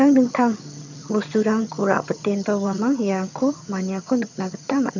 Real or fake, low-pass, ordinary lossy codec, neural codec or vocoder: fake; 7.2 kHz; none; vocoder, 22.05 kHz, 80 mel bands, HiFi-GAN